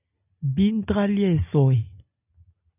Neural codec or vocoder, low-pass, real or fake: none; 3.6 kHz; real